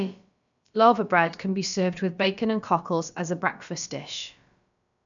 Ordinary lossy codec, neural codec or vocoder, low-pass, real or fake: none; codec, 16 kHz, about 1 kbps, DyCAST, with the encoder's durations; 7.2 kHz; fake